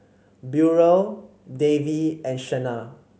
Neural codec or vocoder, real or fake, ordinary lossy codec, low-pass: none; real; none; none